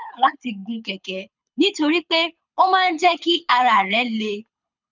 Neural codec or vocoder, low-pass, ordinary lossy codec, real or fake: codec, 24 kHz, 6 kbps, HILCodec; 7.2 kHz; none; fake